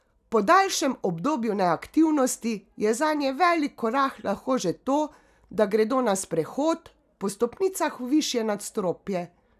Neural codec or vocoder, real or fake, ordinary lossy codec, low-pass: none; real; none; 14.4 kHz